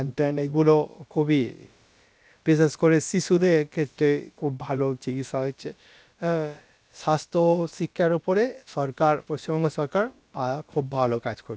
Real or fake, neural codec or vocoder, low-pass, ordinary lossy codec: fake; codec, 16 kHz, about 1 kbps, DyCAST, with the encoder's durations; none; none